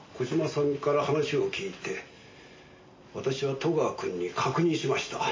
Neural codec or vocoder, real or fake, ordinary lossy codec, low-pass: none; real; MP3, 32 kbps; 7.2 kHz